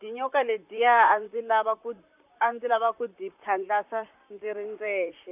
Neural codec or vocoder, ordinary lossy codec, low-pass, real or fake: vocoder, 44.1 kHz, 128 mel bands, Pupu-Vocoder; none; 3.6 kHz; fake